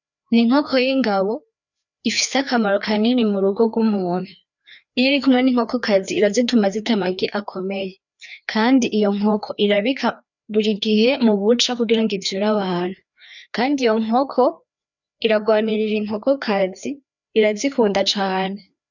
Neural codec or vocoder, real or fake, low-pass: codec, 16 kHz, 2 kbps, FreqCodec, larger model; fake; 7.2 kHz